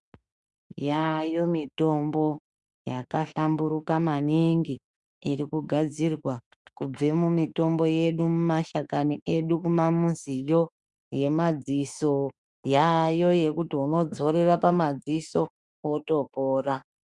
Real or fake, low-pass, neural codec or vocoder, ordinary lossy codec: fake; 10.8 kHz; autoencoder, 48 kHz, 32 numbers a frame, DAC-VAE, trained on Japanese speech; Opus, 64 kbps